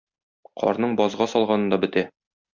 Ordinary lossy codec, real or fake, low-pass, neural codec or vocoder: MP3, 64 kbps; real; 7.2 kHz; none